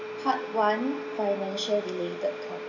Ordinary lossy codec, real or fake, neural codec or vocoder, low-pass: none; real; none; 7.2 kHz